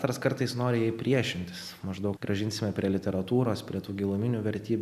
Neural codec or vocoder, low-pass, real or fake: none; 14.4 kHz; real